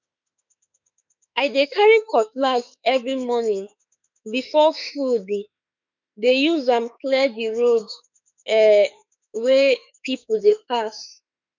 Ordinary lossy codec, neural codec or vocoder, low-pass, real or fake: none; autoencoder, 48 kHz, 32 numbers a frame, DAC-VAE, trained on Japanese speech; 7.2 kHz; fake